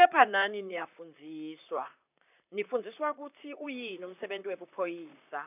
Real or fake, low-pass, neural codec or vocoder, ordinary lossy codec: fake; 3.6 kHz; vocoder, 44.1 kHz, 128 mel bands, Pupu-Vocoder; none